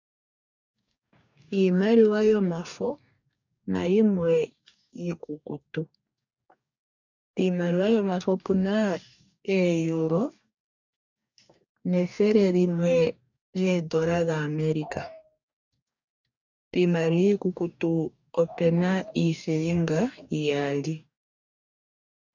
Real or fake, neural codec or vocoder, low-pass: fake; codec, 44.1 kHz, 2.6 kbps, DAC; 7.2 kHz